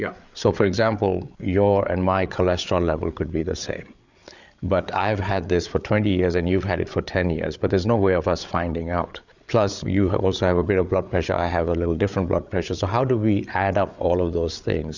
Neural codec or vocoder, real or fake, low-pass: codec, 16 kHz, 8 kbps, FreqCodec, larger model; fake; 7.2 kHz